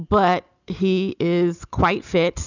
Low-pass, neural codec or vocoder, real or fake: 7.2 kHz; none; real